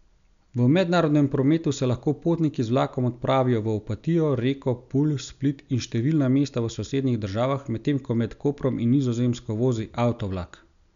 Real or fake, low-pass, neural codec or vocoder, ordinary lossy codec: real; 7.2 kHz; none; none